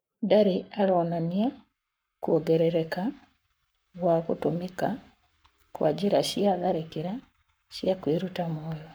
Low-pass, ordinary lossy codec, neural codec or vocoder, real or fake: none; none; codec, 44.1 kHz, 7.8 kbps, Pupu-Codec; fake